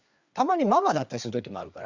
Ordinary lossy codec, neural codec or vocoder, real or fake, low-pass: Opus, 64 kbps; codec, 16 kHz, 4 kbps, FreqCodec, larger model; fake; 7.2 kHz